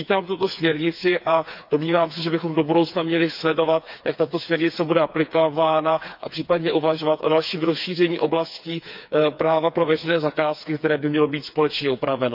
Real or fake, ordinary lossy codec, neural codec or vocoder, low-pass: fake; none; codec, 16 kHz, 4 kbps, FreqCodec, smaller model; 5.4 kHz